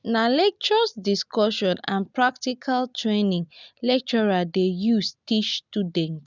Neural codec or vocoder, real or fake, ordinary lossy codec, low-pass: none; real; none; 7.2 kHz